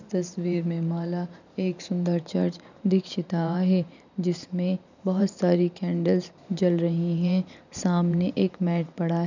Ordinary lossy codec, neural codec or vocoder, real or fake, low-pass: none; vocoder, 44.1 kHz, 128 mel bands every 512 samples, BigVGAN v2; fake; 7.2 kHz